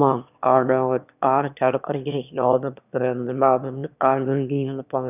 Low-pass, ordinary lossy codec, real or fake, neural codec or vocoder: 3.6 kHz; none; fake; autoencoder, 22.05 kHz, a latent of 192 numbers a frame, VITS, trained on one speaker